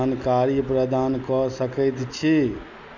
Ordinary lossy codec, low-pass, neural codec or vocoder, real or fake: Opus, 64 kbps; 7.2 kHz; none; real